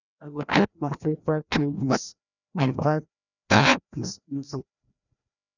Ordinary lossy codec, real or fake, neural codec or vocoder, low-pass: none; fake; codec, 16 kHz, 1 kbps, FreqCodec, larger model; 7.2 kHz